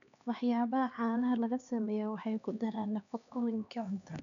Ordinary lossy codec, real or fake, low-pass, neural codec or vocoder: none; fake; 7.2 kHz; codec, 16 kHz, 2 kbps, X-Codec, HuBERT features, trained on LibriSpeech